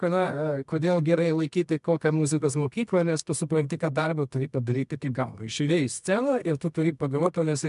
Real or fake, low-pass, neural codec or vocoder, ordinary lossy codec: fake; 10.8 kHz; codec, 24 kHz, 0.9 kbps, WavTokenizer, medium music audio release; MP3, 96 kbps